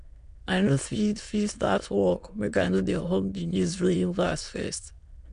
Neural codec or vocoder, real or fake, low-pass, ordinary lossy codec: autoencoder, 22.05 kHz, a latent of 192 numbers a frame, VITS, trained on many speakers; fake; 9.9 kHz; MP3, 96 kbps